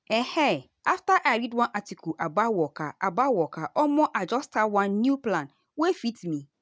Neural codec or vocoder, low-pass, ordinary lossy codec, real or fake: none; none; none; real